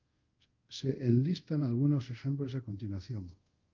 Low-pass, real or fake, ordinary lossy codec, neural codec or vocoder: 7.2 kHz; fake; Opus, 24 kbps; codec, 24 kHz, 0.5 kbps, DualCodec